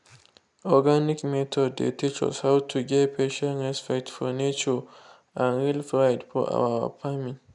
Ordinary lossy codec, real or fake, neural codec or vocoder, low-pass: none; real; none; 10.8 kHz